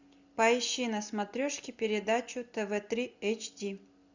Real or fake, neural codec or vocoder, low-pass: real; none; 7.2 kHz